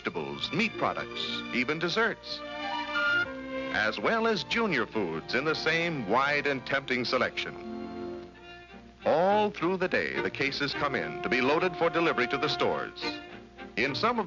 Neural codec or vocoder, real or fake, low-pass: none; real; 7.2 kHz